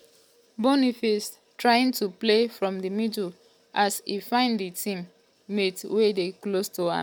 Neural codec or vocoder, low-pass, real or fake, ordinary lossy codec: none; none; real; none